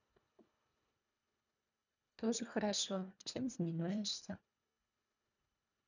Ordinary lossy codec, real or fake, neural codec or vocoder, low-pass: none; fake; codec, 24 kHz, 1.5 kbps, HILCodec; 7.2 kHz